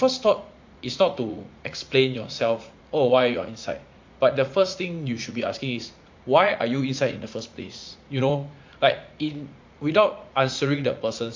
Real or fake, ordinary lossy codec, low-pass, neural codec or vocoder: fake; MP3, 48 kbps; 7.2 kHz; vocoder, 44.1 kHz, 80 mel bands, Vocos